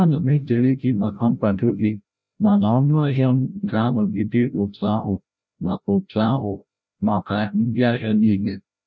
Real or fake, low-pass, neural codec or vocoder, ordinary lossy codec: fake; none; codec, 16 kHz, 0.5 kbps, FreqCodec, larger model; none